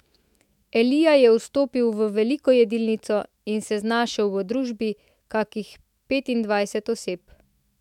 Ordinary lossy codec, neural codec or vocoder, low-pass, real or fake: MP3, 96 kbps; autoencoder, 48 kHz, 128 numbers a frame, DAC-VAE, trained on Japanese speech; 19.8 kHz; fake